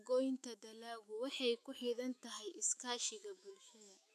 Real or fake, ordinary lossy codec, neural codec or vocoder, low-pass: fake; none; autoencoder, 48 kHz, 128 numbers a frame, DAC-VAE, trained on Japanese speech; 14.4 kHz